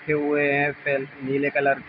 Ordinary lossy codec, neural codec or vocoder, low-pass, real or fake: none; none; 5.4 kHz; real